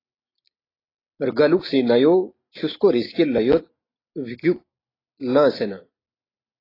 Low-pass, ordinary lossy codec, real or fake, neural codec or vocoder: 5.4 kHz; AAC, 24 kbps; real; none